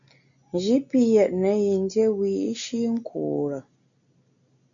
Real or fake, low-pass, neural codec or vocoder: real; 7.2 kHz; none